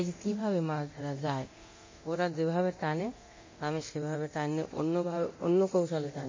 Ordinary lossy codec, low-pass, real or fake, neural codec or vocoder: MP3, 32 kbps; 7.2 kHz; fake; codec, 24 kHz, 0.9 kbps, DualCodec